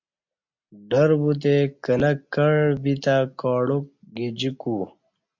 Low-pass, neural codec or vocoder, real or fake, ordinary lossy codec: 7.2 kHz; none; real; MP3, 64 kbps